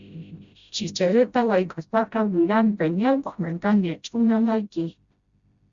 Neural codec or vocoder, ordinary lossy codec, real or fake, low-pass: codec, 16 kHz, 0.5 kbps, FreqCodec, smaller model; Opus, 64 kbps; fake; 7.2 kHz